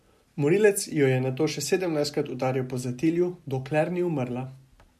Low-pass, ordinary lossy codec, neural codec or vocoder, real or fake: 14.4 kHz; MP3, 64 kbps; none; real